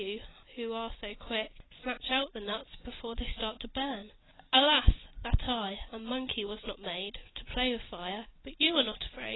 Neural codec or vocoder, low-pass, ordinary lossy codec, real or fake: none; 7.2 kHz; AAC, 16 kbps; real